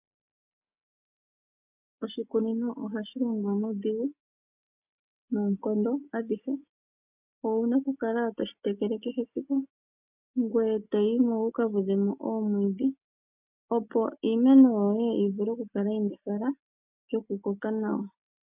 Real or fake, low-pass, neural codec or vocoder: real; 3.6 kHz; none